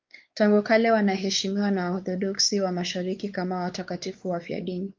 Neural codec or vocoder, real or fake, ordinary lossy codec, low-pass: codec, 16 kHz, 4 kbps, X-Codec, WavLM features, trained on Multilingual LibriSpeech; fake; Opus, 32 kbps; 7.2 kHz